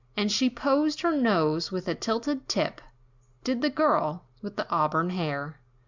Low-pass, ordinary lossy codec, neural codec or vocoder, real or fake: 7.2 kHz; Opus, 64 kbps; none; real